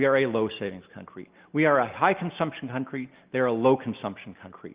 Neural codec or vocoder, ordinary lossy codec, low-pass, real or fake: none; Opus, 32 kbps; 3.6 kHz; real